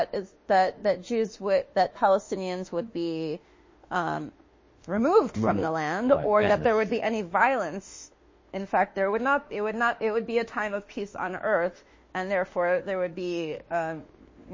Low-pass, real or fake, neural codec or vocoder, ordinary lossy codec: 7.2 kHz; fake; autoencoder, 48 kHz, 32 numbers a frame, DAC-VAE, trained on Japanese speech; MP3, 32 kbps